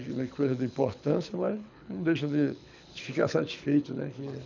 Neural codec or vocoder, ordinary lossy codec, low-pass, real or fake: codec, 24 kHz, 6 kbps, HILCodec; none; 7.2 kHz; fake